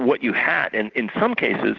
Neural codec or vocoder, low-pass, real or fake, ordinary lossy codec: none; 7.2 kHz; real; Opus, 16 kbps